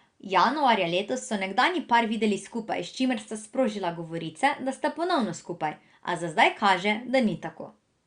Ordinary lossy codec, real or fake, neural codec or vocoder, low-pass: Opus, 64 kbps; real; none; 9.9 kHz